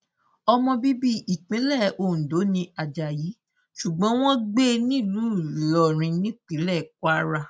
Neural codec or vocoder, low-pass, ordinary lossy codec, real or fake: none; none; none; real